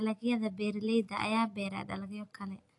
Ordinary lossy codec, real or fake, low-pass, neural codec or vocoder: AAC, 48 kbps; real; 10.8 kHz; none